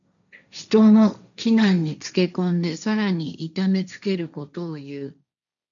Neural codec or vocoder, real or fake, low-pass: codec, 16 kHz, 1.1 kbps, Voila-Tokenizer; fake; 7.2 kHz